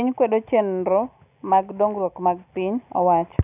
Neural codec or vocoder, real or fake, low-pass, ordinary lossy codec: none; real; 3.6 kHz; none